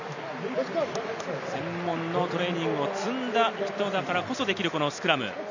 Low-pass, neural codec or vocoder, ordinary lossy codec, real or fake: 7.2 kHz; none; none; real